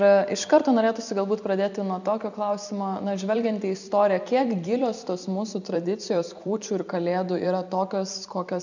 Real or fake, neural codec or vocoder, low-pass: fake; vocoder, 44.1 kHz, 128 mel bands every 256 samples, BigVGAN v2; 7.2 kHz